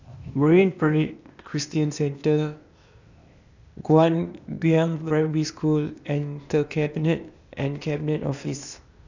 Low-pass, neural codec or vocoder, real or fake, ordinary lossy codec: 7.2 kHz; codec, 16 kHz, 0.8 kbps, ZipCodec; fake; none